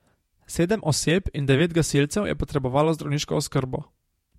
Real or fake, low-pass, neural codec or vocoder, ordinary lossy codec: fake; 19.8 kHz; vocoder, 48 kHz, 128 mel bands, Vocos; MP3, 64 kbps